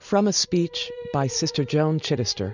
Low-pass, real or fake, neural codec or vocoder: 7.2 kHz; real; none